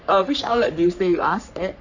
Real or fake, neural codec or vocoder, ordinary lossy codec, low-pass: fake; codec, 44.1 kHz, 3.4 kbps, Pupu-Codec; none; 7.2 kHz